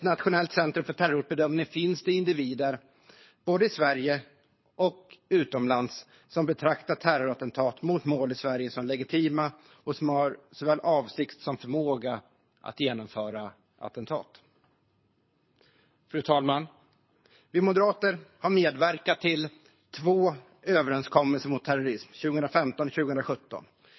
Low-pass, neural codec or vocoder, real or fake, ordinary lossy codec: 7.2 kHz; codec, 24 kHz, 6 kbps, HILCodec; fake; MP3, 24 kbps